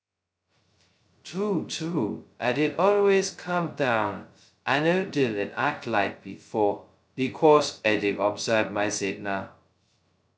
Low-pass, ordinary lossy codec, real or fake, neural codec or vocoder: none; none; fake; codec, 16 kHz, 0.2 kbps, FocalCodec